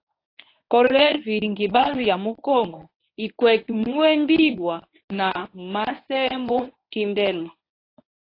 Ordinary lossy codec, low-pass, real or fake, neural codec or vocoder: AAC, 32 kbps; 5.4 kHz; fake; codec, 24 kHz, 0.9 kbps, WavTokenizer, medium speech release version 1